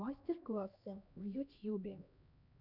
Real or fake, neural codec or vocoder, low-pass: fake; codec, 16 kHz, 1 kbps, X-Codec, HuBERT features, trained on LibriSpeech; 5.4 kHz